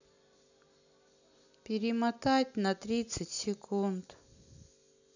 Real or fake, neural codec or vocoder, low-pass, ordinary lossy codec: real; none; 7.2 kHz; none